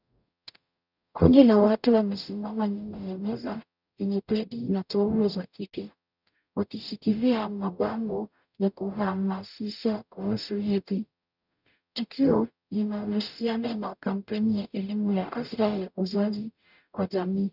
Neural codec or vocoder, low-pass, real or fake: codec, 44.1 kHz, 0.9 kbps, DAC; 5.4 kHz; fake